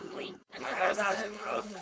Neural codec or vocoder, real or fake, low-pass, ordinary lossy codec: codec, 16 kHz, 4.8 kbps, FACodec; fake; none; none